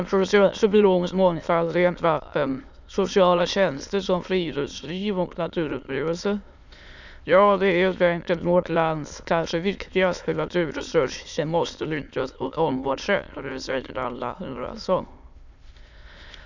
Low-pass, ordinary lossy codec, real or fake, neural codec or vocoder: 7.2 kHz; none; fake; autoencoder, 22.05 kHz, a latent of 192 numbers a frame, VITS, trained on many speakers